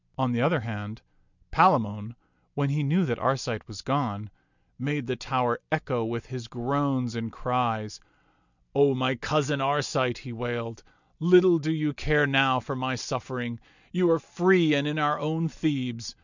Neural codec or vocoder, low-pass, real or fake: none; 7.2 kHz; real